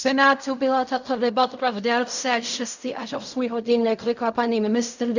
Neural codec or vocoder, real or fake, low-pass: codec, 16 kHz in and 24 kHz out, 0.4 kbps, LongCat-Audio-Codec, fine tuned four codebook decoder; fake; 7.2 kHz